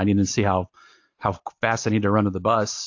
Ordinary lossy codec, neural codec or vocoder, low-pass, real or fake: AAC, 48 kbps; none; 7.2 kHz; real